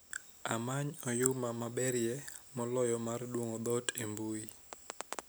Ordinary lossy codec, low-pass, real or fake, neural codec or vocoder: none; none; real; none